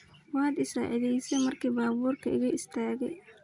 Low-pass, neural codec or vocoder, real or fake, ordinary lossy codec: 10.8 kHz; none; real; none